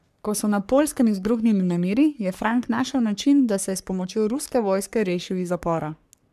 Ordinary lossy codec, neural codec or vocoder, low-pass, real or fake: none; codec, 44.1 kHz, 3.4 kbps, Pupu-Codec; 14.4 kHz; fake